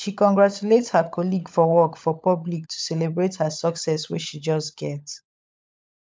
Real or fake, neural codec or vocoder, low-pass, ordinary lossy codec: fake; codec, 16 kHz, 16 kbps, FunCodec, trained on LibriTTS, 50 frames a second; none; none